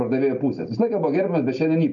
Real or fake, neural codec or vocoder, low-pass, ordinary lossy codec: real; none; 7.2 kHz; MP3, 64 kbps